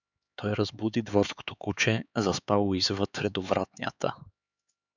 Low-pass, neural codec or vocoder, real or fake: 7.2 kHz; codec, 16 kHz, 4 kbps, X-Codec, HuBERT features, trained on LibriSpeech; fake